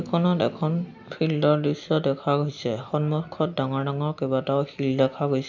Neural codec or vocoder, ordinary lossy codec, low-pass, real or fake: none; none; 7.2 kHz; real